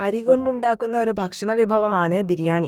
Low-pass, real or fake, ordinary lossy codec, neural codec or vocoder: 19.8 kHz; fake; none; codec, 44.1 kHz, 2.6 kbps, DAC